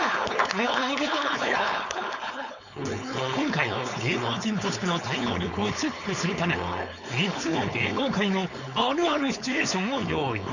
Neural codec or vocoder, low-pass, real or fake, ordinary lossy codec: codec, 16 kHz, 4.8 kbps, FACodec; 7.2 kHz; fake; none